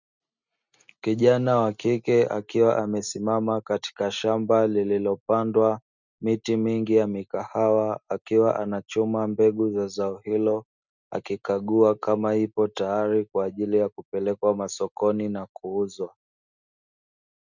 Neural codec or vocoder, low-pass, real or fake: none; 7.2 kHz; real